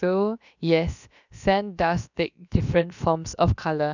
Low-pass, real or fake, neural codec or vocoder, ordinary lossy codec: 7.2 kHz; fake; codec, 16 kHz, about 1 kbps, DyCAST, with the encoder's durations; none